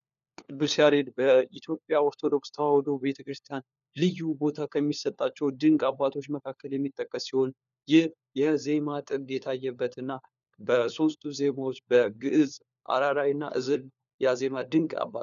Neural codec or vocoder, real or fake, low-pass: codec, 16 kHz, 4 kbps, FunCodec, trained on LibriTTS, 50 frames a second; fake; 7.2 kHz